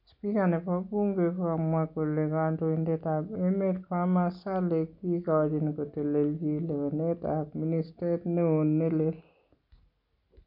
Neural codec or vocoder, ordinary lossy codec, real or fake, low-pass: none; none; real; 5.4 kHz